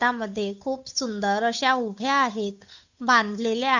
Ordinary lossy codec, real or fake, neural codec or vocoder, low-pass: none; fake; codec, 16 kHz, 8 kbps, FunCodec, trained on Chinese and English, 25 frames a second; 7.2 kHz